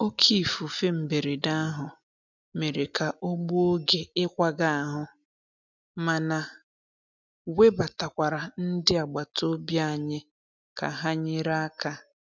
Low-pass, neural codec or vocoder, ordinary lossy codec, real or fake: 7.2 kHz; none; none; real